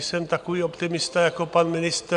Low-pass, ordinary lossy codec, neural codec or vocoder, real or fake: 10.8 kHz; MP3, 96 kbps; none; real